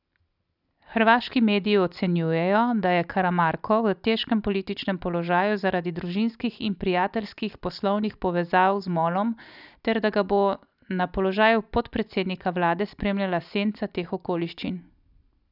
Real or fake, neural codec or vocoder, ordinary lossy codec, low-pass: real; none; none; 5.4 kHz